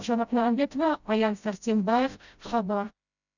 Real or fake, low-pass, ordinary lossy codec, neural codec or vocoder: fake; 7.2 kHz; none; codec, 16 kHz, 0.5 kbps, FreqCodec, smaller model